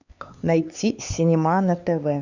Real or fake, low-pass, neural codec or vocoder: fake; 7.2 kHz; codec, 16 kHz, 4 kbps, X-Codec, HuBERT features, trained on LibriSpeech